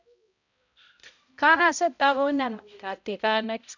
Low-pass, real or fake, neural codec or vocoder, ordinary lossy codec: 7.2 kHz; fake; codec, 16 kHz, 0.5 kbps, X-Codec, HuBERT features, trained on balanced general audio; none